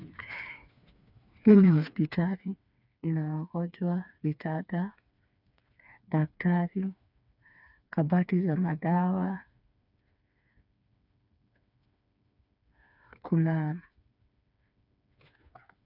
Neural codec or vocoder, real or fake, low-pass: codec, 16 kHz, 4 kbps, FreqCodec, smaller model; fake; 5.4 kHz